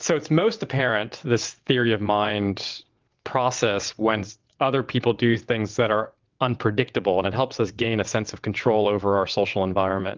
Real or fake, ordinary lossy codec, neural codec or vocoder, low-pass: fake; Opus, 24 kbps; vocoder, 22.05 kHz, 80 mel bands, WaveNeXt; 7.2 kHz